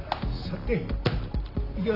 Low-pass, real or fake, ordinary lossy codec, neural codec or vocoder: 5.4 kHz; real; AAC, 32 kbps; none